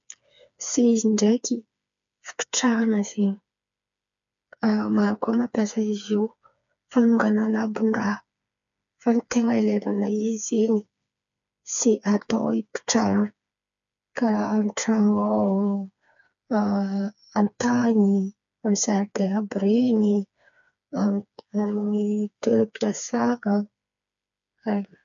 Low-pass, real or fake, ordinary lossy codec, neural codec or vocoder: 7.2 kHz; fake; none; codec, 16 kHz, 4 kbps, FreqCodec, smaller model